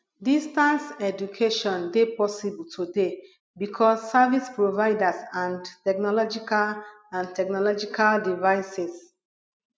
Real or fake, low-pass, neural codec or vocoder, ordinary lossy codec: real; none; none; none